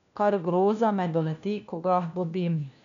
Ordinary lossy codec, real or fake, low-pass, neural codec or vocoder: none; fake; 7.2 kHz; codec, 16 kHz, 1 kbps, FunCodec, trained on LibriTTS, 50 frames a second